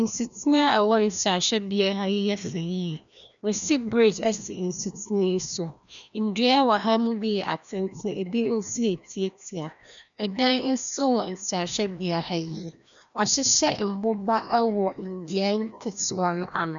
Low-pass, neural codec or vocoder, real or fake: 7.2 kHz; codec, 16 kHz, 1 kbps, FreqCodec, larger model; fake